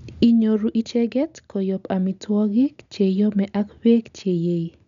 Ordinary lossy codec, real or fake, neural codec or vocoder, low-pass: none; real; none; 7.2 kHz